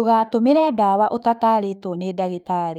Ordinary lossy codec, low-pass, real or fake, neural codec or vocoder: none; 19.8 kHz; fake; autoencoder, 48 kHz, 32 numbers a frame, DAC-VAE, trained on Japanese speech